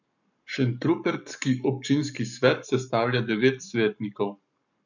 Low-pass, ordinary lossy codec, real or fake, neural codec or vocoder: 7.2 kHz; none; fake; codec, 44.1 kHz, 7.8 kbps, Pupu-Codec